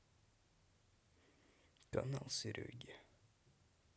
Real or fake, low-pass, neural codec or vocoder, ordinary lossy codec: real; none; none; none